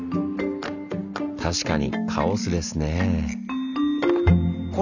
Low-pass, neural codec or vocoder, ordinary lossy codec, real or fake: 7.2 kHz; none; none; real